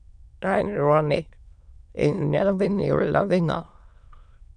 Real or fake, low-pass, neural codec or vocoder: fake; 9.9 kHz; autoencoder, 22.05 kHz, a latent of 192 numbers a frame, VITS, trained on many speakers